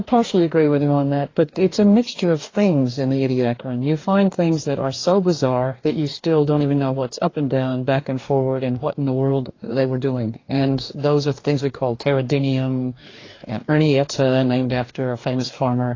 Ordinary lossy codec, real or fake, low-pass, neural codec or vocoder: AAC, 32 kbps; fake; 7.2 kHz; codec, 44.1 kHz, 2.6 kbps, DAC